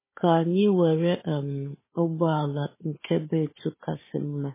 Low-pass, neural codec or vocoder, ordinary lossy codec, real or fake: 3.6 kHz; codec, 16 kHz, 4 kbps, FunCodec, trained on Chinese and English, 50 frames a second; MP3, 16 kbps; fake